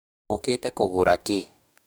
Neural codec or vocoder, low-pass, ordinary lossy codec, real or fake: codec, 44.1 kHz, 2.6 kbps, DAC; none; none; fake